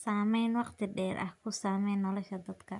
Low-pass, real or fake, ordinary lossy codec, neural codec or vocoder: 10.8 kHz; real; none; none